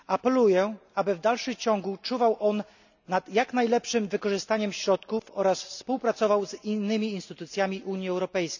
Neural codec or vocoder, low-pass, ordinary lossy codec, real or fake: none; 7.2 kHz; none; real